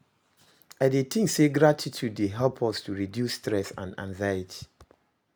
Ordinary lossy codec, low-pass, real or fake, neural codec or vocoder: none; none; real; none